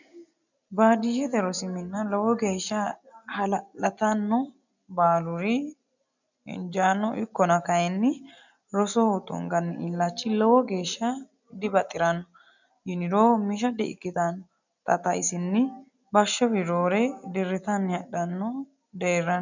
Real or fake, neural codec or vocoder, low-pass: real; none; 7.2 kHz